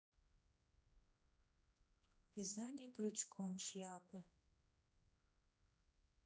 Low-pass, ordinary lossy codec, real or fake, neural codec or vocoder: none; none; fake; codec, 16 kHz, 1 kbps, X-Codec, HuBERT features, trained on general audio